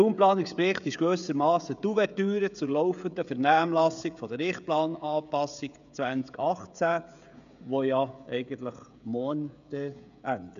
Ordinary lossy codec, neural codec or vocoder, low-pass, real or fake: none; codec, 16 kHz, 16 kbps, FreqCodec, smaller model; 7.2 kHz; fake